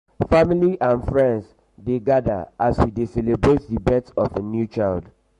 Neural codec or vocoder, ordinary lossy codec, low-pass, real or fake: codec, 44.1 kHz, 7.8 kbps, Pupu-Codec; MP3, 48 kbps; 14.4 kHz; fake